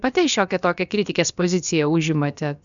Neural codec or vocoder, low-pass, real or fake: codec, 16 kHz, about 1 kbps, DyCAST, with the encoder's durations; 7.2 kHz; fake